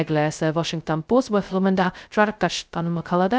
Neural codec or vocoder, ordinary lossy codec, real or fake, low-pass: codec, 16 kHz, 0.2 kbps, FocalCodec; none; fake; none